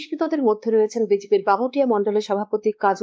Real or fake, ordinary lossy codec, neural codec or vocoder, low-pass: fake; none; codec, 16 kHz, 2 kbps, X-Codec, WavLM features, trained on Multilingual LibriSpeech; none